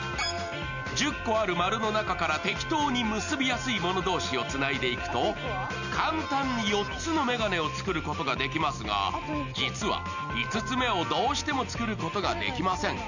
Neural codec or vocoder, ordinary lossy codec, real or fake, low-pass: none; none; real; 7.2 kHz